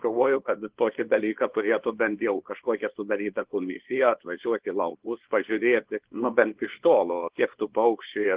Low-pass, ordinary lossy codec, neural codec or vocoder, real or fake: 3.6 kHz; Opus, 16 kbps; codec, 24 kHz, 0.9 kbps, WavTokenizer, small release; fake